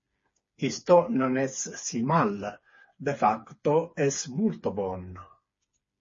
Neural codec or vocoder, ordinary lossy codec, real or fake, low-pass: codec, 16 kHz, 4 kbps, FreqCodec, smaller model; MP3, 32 kbps; fake; 7.2 kHz